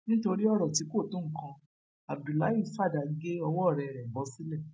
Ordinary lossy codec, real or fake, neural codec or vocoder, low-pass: none; real; none; none